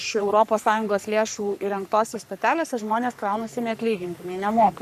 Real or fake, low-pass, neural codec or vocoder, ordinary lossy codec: fake; 14.4 kHz; codec, 44.1 kHz, 3.4 kbps, Pupu-Codec; MP3, 96 kbps